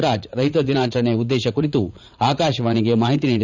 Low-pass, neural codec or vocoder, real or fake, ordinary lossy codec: 7.2 kHz; vocoder, 44.1 kHz, 80 mel bands, Vocos; fake; none